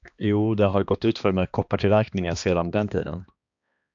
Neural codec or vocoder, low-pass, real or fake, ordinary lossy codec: codec, 16 kHz, 2 kbps, X-Codec, HuBERT features, trained on balanced general audio; 7.2 kHz; fake; AAC, 64 kbps